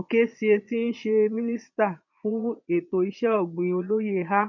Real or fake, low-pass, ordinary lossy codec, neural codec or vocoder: fake; 7.2 kHz; none; vocoder, 22.05 kHz, 80 mel bands, Vocos